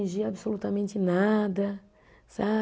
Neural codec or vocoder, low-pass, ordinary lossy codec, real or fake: none; none; none; real